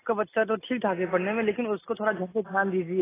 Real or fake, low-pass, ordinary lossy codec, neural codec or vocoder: real; 3.6 kHz; AAC, 16 kbps; none